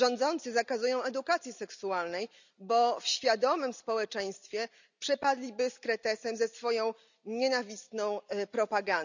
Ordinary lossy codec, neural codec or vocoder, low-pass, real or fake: none; none; 7.2 kHz; real